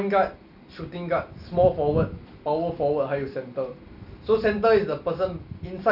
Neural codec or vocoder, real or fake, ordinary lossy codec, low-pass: none; real; none; 5.4 kHz